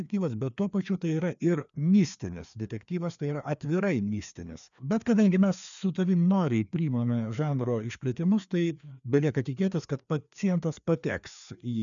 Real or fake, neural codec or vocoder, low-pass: fake; codec, 16 kHz, 2 kbps, FreqCodec, larger model; 7.2 kHz